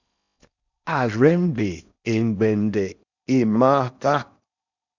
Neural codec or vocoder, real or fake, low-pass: codec, 16 kHz in and 24 kHz out, 0.6 kbps, FocalCodec, streaming, 4096 codes; fake; 7.2 kHz